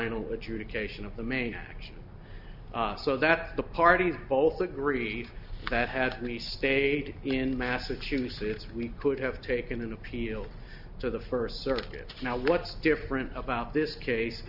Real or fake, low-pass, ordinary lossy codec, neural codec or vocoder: real; 5.4 kHz; Opus, 64 kbps; none